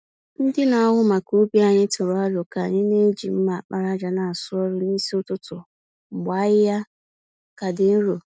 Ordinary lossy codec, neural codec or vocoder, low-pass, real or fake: none; none; none; real